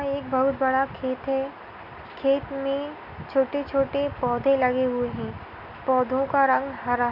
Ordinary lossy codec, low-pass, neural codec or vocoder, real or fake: none; 5.4 kHz; none; real